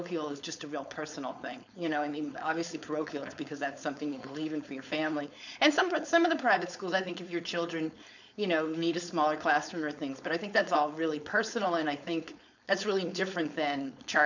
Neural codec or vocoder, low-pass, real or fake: codec, 16 kHz, 4.8 kbps, FACodec; 7.2 kHz; fake